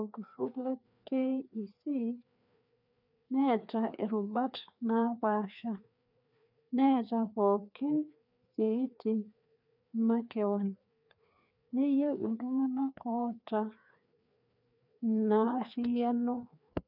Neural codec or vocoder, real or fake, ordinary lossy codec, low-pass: codec, 16 kHz, 2 kbps, FreqCodec, larger model; fake; none; 5.4 kHz